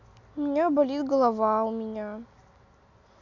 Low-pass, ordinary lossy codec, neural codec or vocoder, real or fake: 7.2 kHz; none; none; real